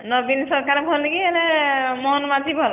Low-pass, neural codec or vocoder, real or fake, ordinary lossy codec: 3.6 kHz; none; real; none